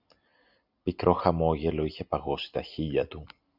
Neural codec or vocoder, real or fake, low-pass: none; real; 5.4 kHz